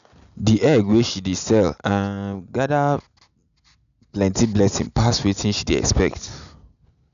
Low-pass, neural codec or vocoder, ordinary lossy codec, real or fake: 7.2 kHz; none; none; real